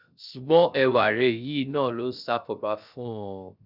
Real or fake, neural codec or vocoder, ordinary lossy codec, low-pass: fake; codec, 16 kHz, 0.3 kbps, FocalCodec; none; 5.4 kHz